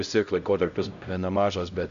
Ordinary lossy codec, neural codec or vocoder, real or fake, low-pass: AAC, 96 kbps; codec, 16 kHz, 0.5 kbps, X-Codec, HuBERT features, trained on LibriSpeech; fake; 7.2 kHz